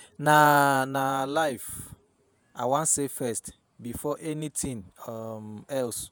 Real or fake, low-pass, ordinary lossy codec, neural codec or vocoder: fake; none; none; vocoder, 48 kHz, 128 mel bands, Vocos